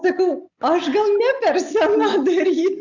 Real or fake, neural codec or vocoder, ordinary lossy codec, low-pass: real; none; Opus, 64 kbps; 7.2 kHz